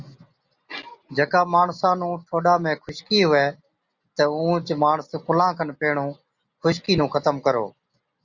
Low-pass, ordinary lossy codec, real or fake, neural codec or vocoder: 7.2 kHz; Opus, 64 kbps; real; none